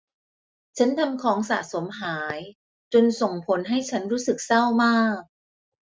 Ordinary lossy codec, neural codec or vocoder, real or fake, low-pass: none; none; real; none